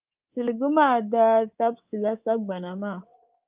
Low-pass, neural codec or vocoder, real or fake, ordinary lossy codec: 3.6 kHz; codec, 24 kHz, 3.1 kbps, DualCodec; fake; Opus, 32 kbps